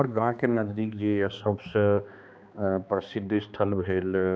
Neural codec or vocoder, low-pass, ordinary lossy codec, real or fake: codec, 16 kHz, 2 kbps, X-Codec, HuBERT features, trained on balanced general audio; none; none; fake